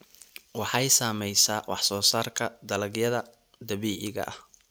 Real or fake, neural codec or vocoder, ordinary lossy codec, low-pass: real; none; none; none